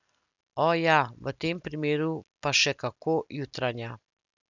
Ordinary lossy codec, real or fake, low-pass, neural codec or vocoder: none; real; 7.2 kHz; none